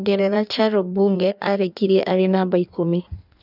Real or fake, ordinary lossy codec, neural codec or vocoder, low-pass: fake; none; codec, 16 kHz in and 24 kHz out, 1.1 kbps, FireRedTTS-2 codec; 5.4 kHz